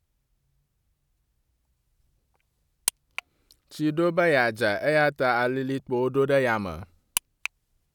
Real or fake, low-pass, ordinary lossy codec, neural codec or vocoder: real; 19.8 kHz; none; none